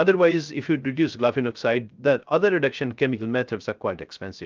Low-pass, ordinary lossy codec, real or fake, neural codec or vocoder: 7.2 kHz; Opus, 24 kbps; fake; codec, 16 kHz, 0.3 kbps, FocalCodec